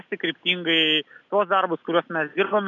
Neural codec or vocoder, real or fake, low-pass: none; real; 7.2 kHz